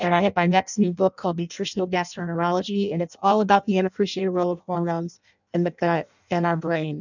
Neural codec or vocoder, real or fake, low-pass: codec, 16 kHz in and 24 kHz out, 0.6 kbps, FireRedTTS-2 codec; fake; 7.2 kHz